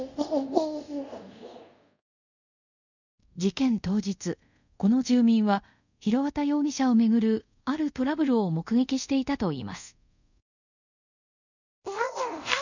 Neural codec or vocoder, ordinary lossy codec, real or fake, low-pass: codec, 24 kHz, 0.5 kbps, DualCodec; none; fake; 7.2 kHz